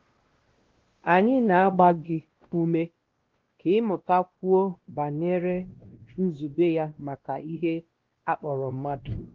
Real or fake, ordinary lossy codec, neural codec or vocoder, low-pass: fake; Opus, 16 kbps; codec, 16 kHz, 1 kbps, X-Codec, WavLM features, trained on Multilingual LibriSpeech; 7.2 kHz